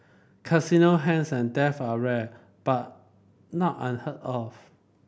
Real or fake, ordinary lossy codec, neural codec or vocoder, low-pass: real; none; none; none